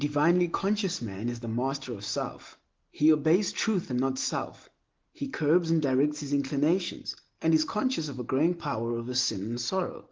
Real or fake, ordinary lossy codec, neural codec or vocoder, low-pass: real; Opus, 16 kbps; none; 7.2 kHz